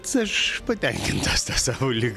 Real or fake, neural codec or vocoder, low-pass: real; none; 14.4 kHz